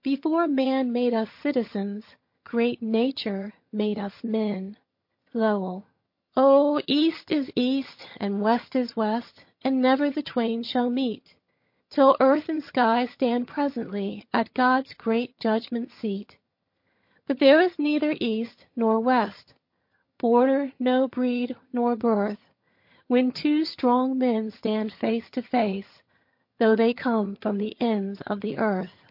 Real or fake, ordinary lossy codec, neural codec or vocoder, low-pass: fake; MP3, 32 kbps; vocoder, 22.05 kHz, 80 mel bands, HiFi-GAN; 5.4 kHz